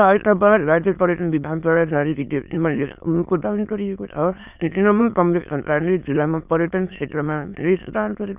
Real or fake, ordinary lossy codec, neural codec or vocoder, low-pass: fake; none; autoencoder, 22.05 kHz, a latent of 192 numbers a frame, VITS, trained on many speakers; 3.6 kHz